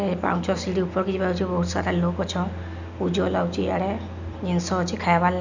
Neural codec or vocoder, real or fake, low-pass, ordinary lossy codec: none; real; 7.2 kHz; none